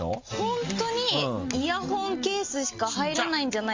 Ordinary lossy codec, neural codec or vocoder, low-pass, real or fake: Opus, 32 kbps; none; 7.2 kHz; real